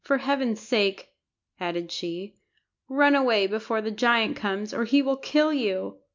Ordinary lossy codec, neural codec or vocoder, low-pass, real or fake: MP3, 64 kbps; none; 7.2 kHz; real